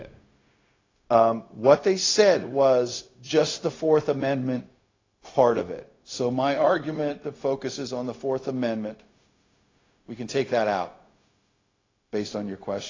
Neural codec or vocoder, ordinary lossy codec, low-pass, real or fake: codec, 16 kHz, 0.4 kbps, LongCat-Audio-Codec; AAC, 32 kbps; 7.2 kHz; fake